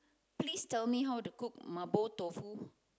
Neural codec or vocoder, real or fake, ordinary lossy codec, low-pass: none; real; none; none